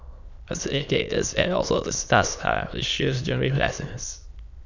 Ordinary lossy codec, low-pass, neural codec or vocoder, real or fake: none; 7.2 kHz; autoencoder, 22.05 kHz, a latent of 192 numbers a frame, VITS, trained on many speakers; fake